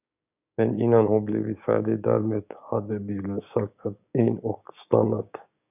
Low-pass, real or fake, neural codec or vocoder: 3.6 kHz; fake; codec, 16 kHz, 6 kbps, DAC